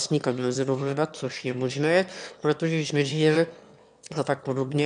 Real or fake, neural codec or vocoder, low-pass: fake; autoencoder, 22.05 kHz, a latent of 192 numbers a frame, VITS, trained on one speaker; 9.9 kHz